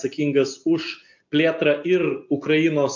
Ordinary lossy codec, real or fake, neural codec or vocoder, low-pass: AAC, 48 kbps; real; none; 7.2 kHz